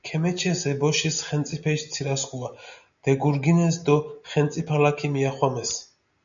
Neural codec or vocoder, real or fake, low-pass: none; real; 7.2 kHz